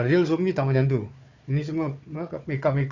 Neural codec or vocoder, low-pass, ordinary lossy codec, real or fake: codec, 16 kHz, 4 kbps, FunCodec, trained on Chinese and English, 50 frames a second; 7.2 kHz; none; fake